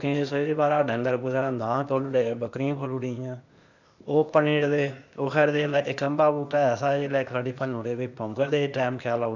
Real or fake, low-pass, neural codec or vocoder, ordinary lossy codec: fake; 7.2 kHz; codec, 16 kHz, 0.8 kbps, ZipCodec; none